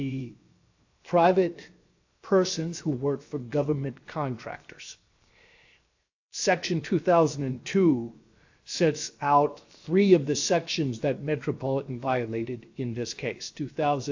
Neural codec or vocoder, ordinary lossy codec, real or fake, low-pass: codec, 16 kHz, 0.7 kbps, FocalCodec; AAC, 48 kbps; fake; 7.2 kHz